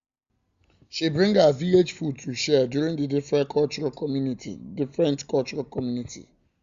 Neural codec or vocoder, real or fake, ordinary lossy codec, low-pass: none; real; Opus, 64 kbps; 7.2 kHz